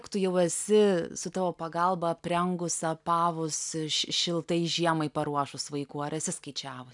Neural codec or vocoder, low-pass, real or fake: none; 10.8 kHz; real